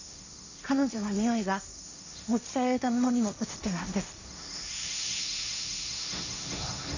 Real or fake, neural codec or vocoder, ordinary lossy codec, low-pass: fake; codec, 16 kHz, 1.1 kbps, Voila-Tokenizer; none; 7.2 kHz